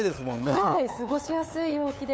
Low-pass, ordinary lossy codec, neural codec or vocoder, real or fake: none; none; codec, 16 kHz, 8 kbps, FunCodec, trained on LibriTTS, 25 frames a second; fake